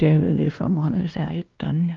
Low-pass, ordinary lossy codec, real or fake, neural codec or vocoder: 7.2 kHz; Opus, 24 kbps; fake; codec, 16 kHz, 1 kbps, X-Codec, WavLM features, trained on Multilingual LibriSpeech